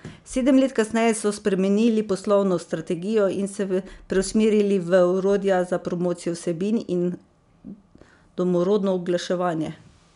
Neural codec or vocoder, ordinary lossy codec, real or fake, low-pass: none; MP3, 96 kbps; real; 10.8 kHz